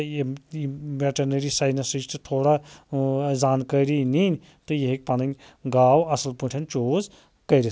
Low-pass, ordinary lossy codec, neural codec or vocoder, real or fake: none; none; none; real